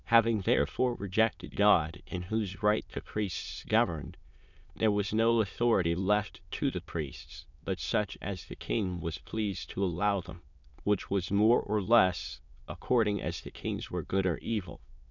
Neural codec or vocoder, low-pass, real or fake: autoencoder, 22.05 kHz, a latent of 192 numbers a frame, VITS, trained on many speakers; 7.2 kHz; fake